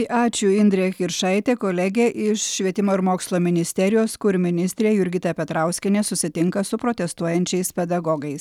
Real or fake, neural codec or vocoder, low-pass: real; none; 19.8 kHz